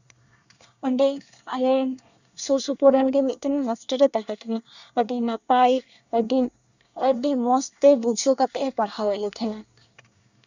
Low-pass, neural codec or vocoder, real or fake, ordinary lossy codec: 7.2 kHz; codec, 24 kHz, 1 kbps, SNAC; fake; none